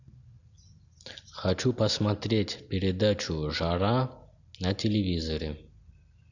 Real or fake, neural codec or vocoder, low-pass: real; none; 7.2 kHz